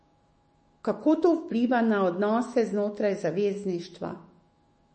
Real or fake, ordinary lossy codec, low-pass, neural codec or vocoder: fake; MP3, 32 kbps; 10.8 kHz; autoencoder, 48 kHz, 128 numbers a frame, DAC-VAE, trained on Japanese speech